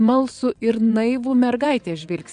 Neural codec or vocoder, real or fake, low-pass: vocoder, 22.05 kHz, 80 mel bands, WaveNeXt; fake; 9.9 kHz